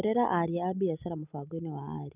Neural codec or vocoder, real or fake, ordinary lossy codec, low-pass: none; real; none; 3.6 kHz